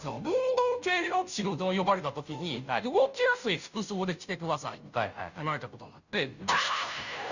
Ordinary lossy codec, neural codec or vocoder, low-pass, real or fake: none; codec, 16 kHz, 0.5 kbps, FunCodec, trained on Chinese and English, 25 frames a second; 7.2 kHz; fake